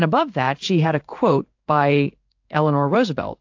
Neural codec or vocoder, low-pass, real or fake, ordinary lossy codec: codec, 16 kHz in and 24 kHz out, 1 kbps, XY-Tokenizer; 7.2 kHz; fake; AAC, 48 kbps